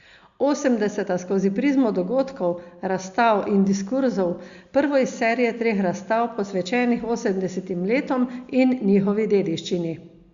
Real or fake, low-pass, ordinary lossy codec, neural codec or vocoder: real; 7.2 kHz; Opus, 64 kbps; none